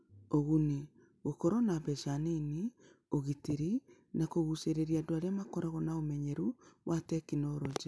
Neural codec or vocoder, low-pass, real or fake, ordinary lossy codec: none; none; real; none